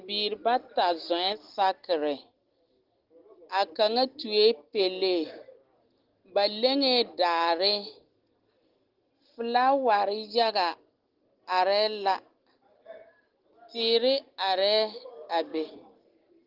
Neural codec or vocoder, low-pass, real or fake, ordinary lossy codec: none; 5.4 kHz; real; Opus, 16 kbps